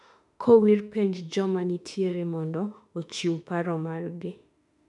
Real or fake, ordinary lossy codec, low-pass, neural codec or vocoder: fake; none; 10.8 kHz; autoencoder, 48 kHz, 32 numbers a frame, DAC-VAE, trained on Japanese speech